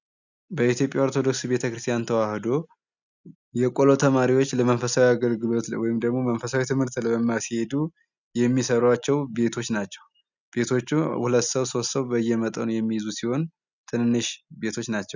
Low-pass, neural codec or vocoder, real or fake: 7.2 kHz; none; real